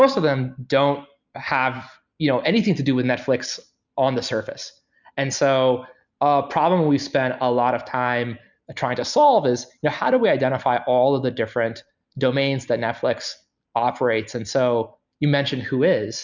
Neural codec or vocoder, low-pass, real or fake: none; 7.2 kHz; real